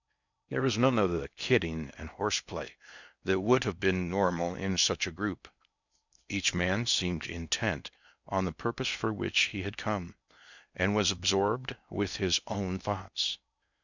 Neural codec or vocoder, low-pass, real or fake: codec, 16 kHz in and 24 kHz out, 0.6 kbps, FocalCodec, streaming, 4096 codes; 7.2 kHz; fake